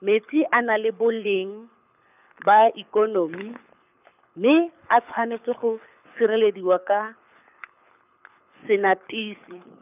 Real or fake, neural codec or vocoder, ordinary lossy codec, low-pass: fake; codec, 24 kHz, 6 kbps, HILCodec; none; 3.6 kHz